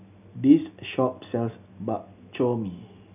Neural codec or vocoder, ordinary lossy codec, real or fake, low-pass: none; none; real; 3.6 kHz